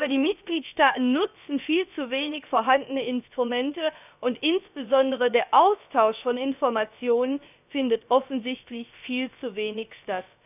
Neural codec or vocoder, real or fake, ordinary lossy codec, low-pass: codec, 16 kHz, about 1 kbps, DyCAST, with the encoder's durations; fake; none; 3.6 kHz